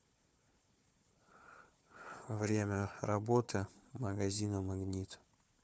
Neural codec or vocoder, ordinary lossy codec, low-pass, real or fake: codec, 16 kHz, 4 kbps, FunCodec, trained on Chinese and English, 50 frames a second; none; none; fake